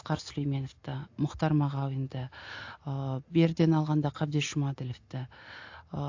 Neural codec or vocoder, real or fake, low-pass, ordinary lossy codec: none; real; 7.2 kHz; AAC, 48 kbps